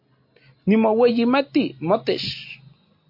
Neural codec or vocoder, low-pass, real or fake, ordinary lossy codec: none; 5.4 kHz; real; MP3, 32 kbps